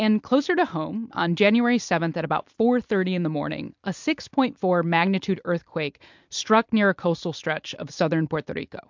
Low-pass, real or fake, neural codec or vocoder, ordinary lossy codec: 7.2 kHz; fake; vocoder, 44.1 kHz, 128 mel bands every 256 samples, BigVGAN v2; MP3, 64 kbps